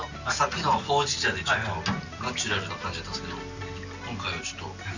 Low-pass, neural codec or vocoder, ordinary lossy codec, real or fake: 7.2 kHz; none; none; real